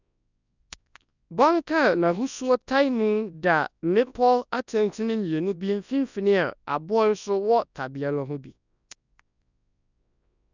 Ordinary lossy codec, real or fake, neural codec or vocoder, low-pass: none; fake; codec, 24 kHz, 0.9 kbps, WavTokenizer, large speech release; 7.2 kHz